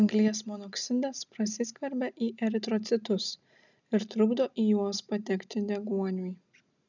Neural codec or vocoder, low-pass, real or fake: none; 7.2 kHz; real